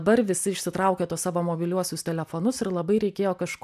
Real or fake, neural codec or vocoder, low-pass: real; none; 14.4 kHz